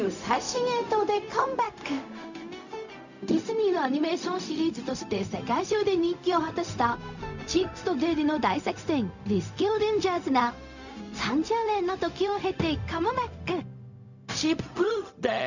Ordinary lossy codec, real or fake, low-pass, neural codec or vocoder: none; fake; 7.2 kHz; codec, 16 kHz, 0.4 kbps, LongCat-Audio-Codec